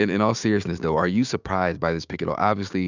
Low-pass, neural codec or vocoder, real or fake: 7.2 kHz; codec, 16 kHz, 6 kbps, DAC; fake